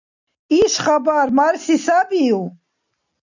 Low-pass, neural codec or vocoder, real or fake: 7.2 kHz; none; real